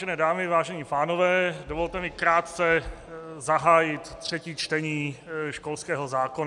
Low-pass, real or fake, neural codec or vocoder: 10.8 kHz; real; none